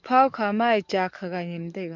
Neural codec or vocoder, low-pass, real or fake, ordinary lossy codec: codec, 44.1 kHz, 7.8 kbps, DAC; 7.2 kHz; fake; MP3, 64 kbps